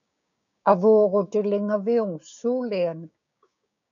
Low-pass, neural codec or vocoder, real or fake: 7.2 kHz; codec, 16 kHz, 6 kbps, DAC; fake